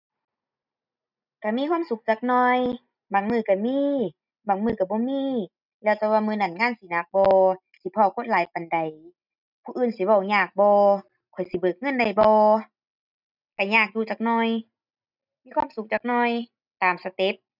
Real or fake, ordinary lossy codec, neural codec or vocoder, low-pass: real; none; none; 5.4 kHz